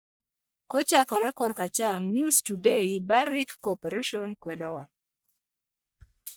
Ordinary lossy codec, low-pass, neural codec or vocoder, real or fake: none; none; codec, 44.1 kHz, 1.7 kbps, Pupu-Codec; fake